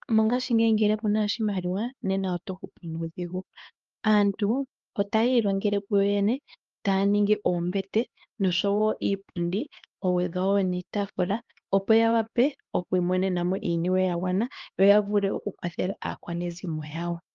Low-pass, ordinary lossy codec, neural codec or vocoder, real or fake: 7.2 kHz; Opus, 32 kbps; codec, 16 kHz, 2 kbps, X-Codec, HuBERT features, trained on LibriSpeech; fake